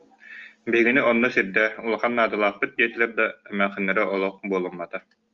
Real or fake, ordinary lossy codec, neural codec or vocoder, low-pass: real; Opus, 32 kbps; none; 7.2 kHz